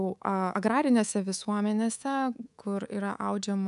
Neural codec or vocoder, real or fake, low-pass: codec, 24 kHz, 3.1 kbps, DualCodec; fake; 10.8 kHz